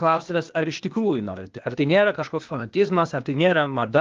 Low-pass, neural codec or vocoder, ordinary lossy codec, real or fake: 7.2 kHz; codec, 16 kHz, 0.8 kbps, ZipCodec; Opus, 32 kbps; fake